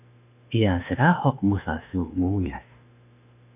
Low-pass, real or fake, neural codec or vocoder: 3.6 kHz; fake; autoencoder, 48 kHz, 32 numbers a frame, DAC-VAE, trained on Japanese speech